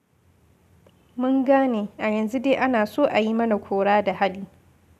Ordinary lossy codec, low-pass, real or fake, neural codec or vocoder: none; 14.4 kHz; real; none